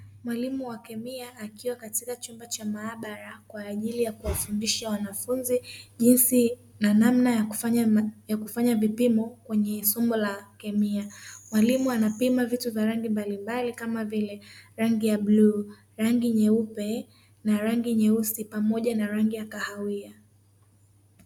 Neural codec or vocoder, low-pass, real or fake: none; 19.8 kHz; real